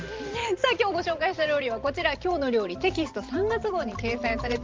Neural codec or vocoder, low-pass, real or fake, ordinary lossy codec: vocoder, 44.1 kHz, 128 mel bands every 512 samples, BigVGAN v2; 7.2 kHz; fake; Opus, 32 kbps